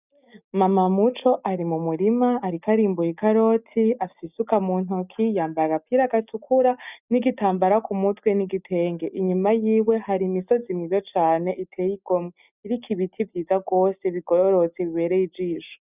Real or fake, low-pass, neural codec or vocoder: real; 3.6 kHz; none